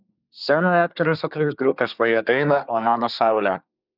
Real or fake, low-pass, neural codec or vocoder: fake; 5.4 kHz; codec, 24 kHz, 1 kbps, SNAC